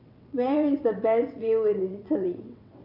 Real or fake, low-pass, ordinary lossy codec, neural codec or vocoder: fake; 5.4 kHz; none; vocoder, 22.05 kHz, 80 mel bands, WaveNeXt